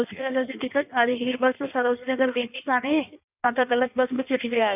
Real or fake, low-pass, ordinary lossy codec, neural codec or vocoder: fake; 3.6 kHz; none; codec, 16 kHz in and 24 kHz out, 1.1 kbps, FireRedTTS-2 codec